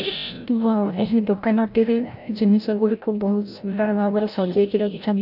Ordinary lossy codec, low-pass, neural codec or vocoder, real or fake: none; 5.4 kHz; codec, 16 kHz, 0.5 kbps, FreqCodec, larger model; fake